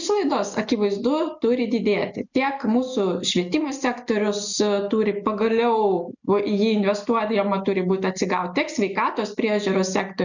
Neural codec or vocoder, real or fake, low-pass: none; real; 7.2 kHz